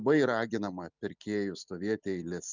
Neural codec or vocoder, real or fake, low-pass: none; real; 7.2 kHz